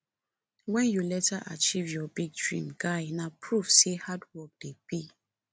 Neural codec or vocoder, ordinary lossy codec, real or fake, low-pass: none; none; real; none